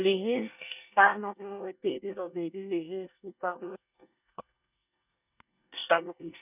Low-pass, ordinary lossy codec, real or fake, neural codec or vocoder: 3.6 kHz; none; fake; codec, 24 kHz, 1 kbps, SNAC